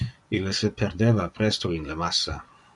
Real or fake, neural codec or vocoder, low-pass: fake; vocoder, 24 kHz, 100 mel bands, Vocos; 10.8 kHz